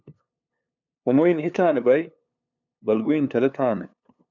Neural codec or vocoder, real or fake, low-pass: codec, 16 kHz, 2 kbps, FunCodec, trained on LibriTTS, 25 frames a second; fake; 7.2 kHz